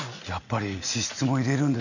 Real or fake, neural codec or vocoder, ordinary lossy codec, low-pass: real; none; none; 7.2 kHz